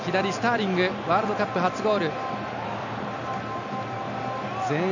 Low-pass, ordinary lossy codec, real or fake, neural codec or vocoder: 7.2 kHz; none; real; none